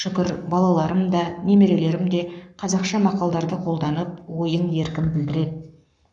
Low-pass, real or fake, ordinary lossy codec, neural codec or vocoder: 9.9 kHz; fake; none; codec, 44.1 kHz, 7.8 kbps, Pupu-Codec